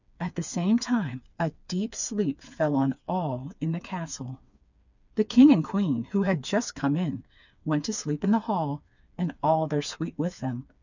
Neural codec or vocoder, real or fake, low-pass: codec, 16 kHz, 4 kbps, FreqCodec, smaller model; fake; 7.2 kHz